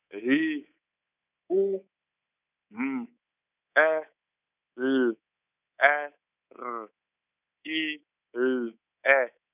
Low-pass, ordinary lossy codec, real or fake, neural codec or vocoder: 3.6 kHz; none; fake; codec, 24 kHz, 3.1 kbps, DualCodec